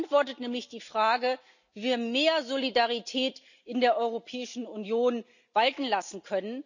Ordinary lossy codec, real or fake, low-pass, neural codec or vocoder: none; real; 7.2 kHz; none